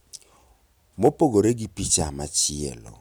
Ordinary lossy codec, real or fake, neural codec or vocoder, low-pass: none; real; none; none